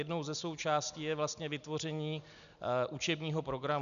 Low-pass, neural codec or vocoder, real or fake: 7.2 kHz; none; real